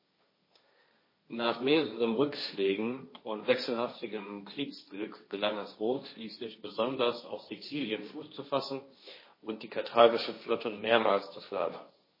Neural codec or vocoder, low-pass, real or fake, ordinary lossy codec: codec, 16 kHz, 1.1 kbps, Voila-Tokenizer; 5.4 kHz; fake; MP3, 24 kbps